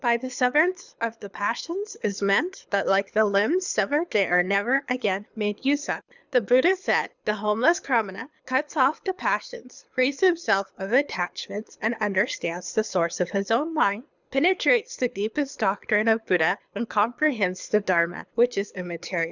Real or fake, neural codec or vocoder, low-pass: fake; codec, 24 kHz, 6 kbps, HILCodec; 7.2 kHz